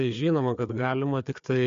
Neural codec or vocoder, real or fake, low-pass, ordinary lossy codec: codec, 16 kHz, 4 kbps, FreqCodec, larger model; fake; 7.2 kHz; MP3, 48 kbps